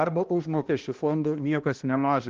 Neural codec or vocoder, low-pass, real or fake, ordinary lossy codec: codec, 16 kHz, 1 kbps, X-Codec, HuBERT features, trained on balanced general audio; 7.2 kHz; fake; Opus, 16 kbps